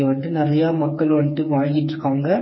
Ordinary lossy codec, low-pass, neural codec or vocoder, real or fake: MP3, 24 kbps; 7.2 kHz; codec, 16 kHz, 4 kbps, FreqCodec, smaller model; fake